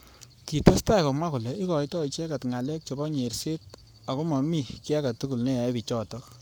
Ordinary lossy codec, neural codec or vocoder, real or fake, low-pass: none; codec, 44.1 kHz, 7.8 kbps, Pupu-Codec; fake; none